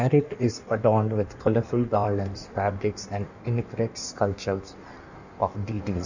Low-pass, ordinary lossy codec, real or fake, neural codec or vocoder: 7.2 kHz; none; fake; codec, 16 kHz in and 24 kHz out, 1.1 kbps, FireRedTTS-2 codec